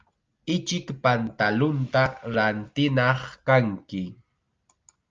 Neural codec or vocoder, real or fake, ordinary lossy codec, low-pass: none; real; Opus, 24 kbps; 7.2 kHz